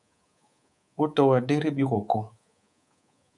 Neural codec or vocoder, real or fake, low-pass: codec, 24 kHz, 3.1 kbps, DualCodec; fake; 10.8 kHz